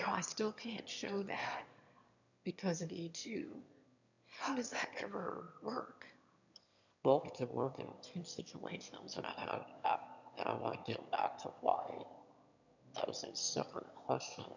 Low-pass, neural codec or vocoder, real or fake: 7.2 kHz; autoencoder, 22.05 kHz, a latent of 192 numbers a frame, VITS, trained on one speaker; fake